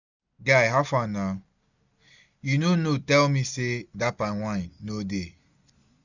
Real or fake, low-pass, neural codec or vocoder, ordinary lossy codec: real; 7.2 kHz; none; none